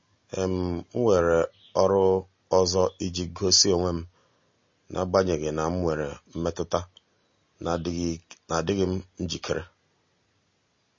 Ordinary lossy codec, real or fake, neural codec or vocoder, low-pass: MP3, 32 kbps; real; none; 7.2 kHz